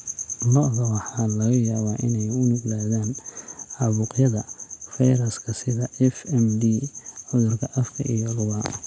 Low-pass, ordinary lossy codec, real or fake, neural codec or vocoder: none; none; real; none